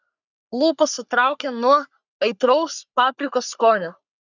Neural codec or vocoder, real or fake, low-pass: codec, 44.1 kHz, 3.4 kbps, Pupu-Codec; fake; 7.2 kHz